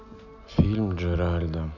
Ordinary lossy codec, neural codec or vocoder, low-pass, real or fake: none; none; 7.2 kHz; real